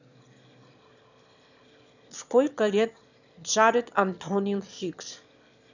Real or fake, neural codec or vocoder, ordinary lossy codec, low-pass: fake; autoencoder, 22.05 kHz, a latent of 192 numbers a frame, VITS, trained on one speaker; none; 7.2 kHz